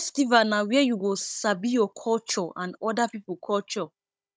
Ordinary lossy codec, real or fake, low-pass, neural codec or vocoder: none; fake; none; codec, 16 kHz, 16 kbps, FunCodec, trained on Chinese and English, 50 frames a second